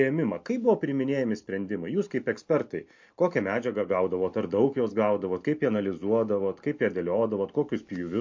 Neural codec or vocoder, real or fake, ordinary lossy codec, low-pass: none; real; MP3, 48 kbps; 7.2 kHz